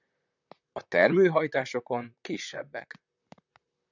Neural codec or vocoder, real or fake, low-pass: vocoder, 44.1 kHz, 128 mel bands, Pupu-Vocoder; fake; 7.2 kHz